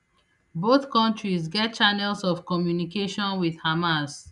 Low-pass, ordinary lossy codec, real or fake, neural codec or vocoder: 10.8 kHz; none; real; none